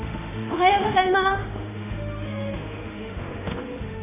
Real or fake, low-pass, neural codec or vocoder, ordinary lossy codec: fake; 3.6 kHz; autoencoder, 48 kHz, 32 numbers a frame, DAC-VAE, trained on Japanese speech; none